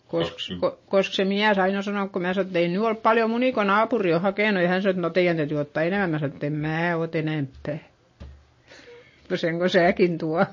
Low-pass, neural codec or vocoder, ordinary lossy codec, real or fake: 7.2 kHz; none; MP3, 32 kbps; real